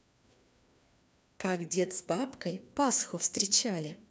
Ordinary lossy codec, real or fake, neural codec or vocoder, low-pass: none; fake; codec, 16 kHz, 2 kbps, FreqCodec, larger model; none